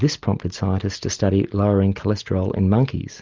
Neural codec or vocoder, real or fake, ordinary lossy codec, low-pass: none; real; Opus, 24 kbps; 7.2 kHz